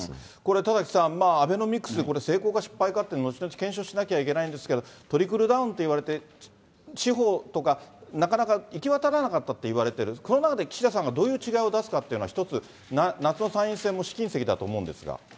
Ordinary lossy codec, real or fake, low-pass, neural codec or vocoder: none; real; none; none